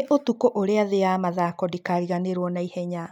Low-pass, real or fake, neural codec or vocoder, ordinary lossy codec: 19.8 kHz; real; none; none